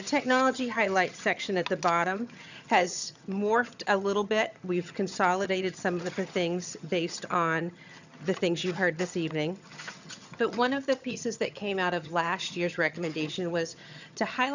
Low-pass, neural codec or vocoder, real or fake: 7.2 kHz; vocoder, 22.05 kHz, 80 mel bands, HiFi-GAN; fake